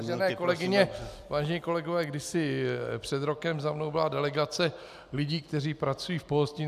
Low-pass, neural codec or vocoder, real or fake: 14.4 kHz; none; real